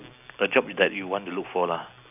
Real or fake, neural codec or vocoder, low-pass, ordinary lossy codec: real; none; 3.6 kHz; none